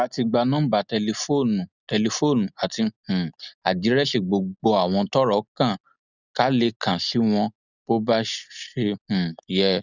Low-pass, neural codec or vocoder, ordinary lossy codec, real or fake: 7.2 kHz; none; none; real